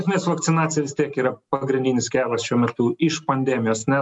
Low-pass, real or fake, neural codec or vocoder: 10.8 kHz; real; none